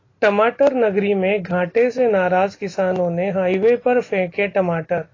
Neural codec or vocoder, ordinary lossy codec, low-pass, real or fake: none; AAC, 32 kbps; 7.2 kHz; real